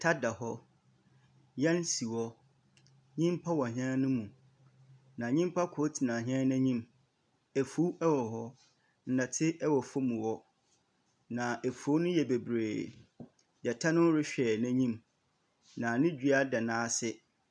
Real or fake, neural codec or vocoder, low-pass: real; none; 9.9 kHz